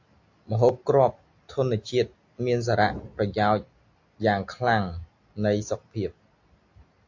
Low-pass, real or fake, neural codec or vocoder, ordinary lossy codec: 7.2 kHz; real; none; AAC, 48 kbps